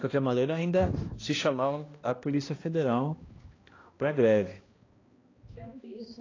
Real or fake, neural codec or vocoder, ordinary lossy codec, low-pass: fake; codec, 16 kHz, 1 kbps, X-Codec, HuBERT features, trained on balanced general audio; AAC, 32 kbps; 7.2 kHz